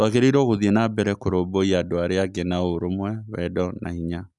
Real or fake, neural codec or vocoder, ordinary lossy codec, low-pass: real; none; none; 10.8 kHz